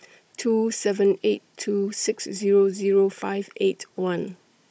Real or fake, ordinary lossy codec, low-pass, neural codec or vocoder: fake; none; none; codec, 16 kHz, 16 kbps, FunCodec, trained on Chinese and English, 50 frames a second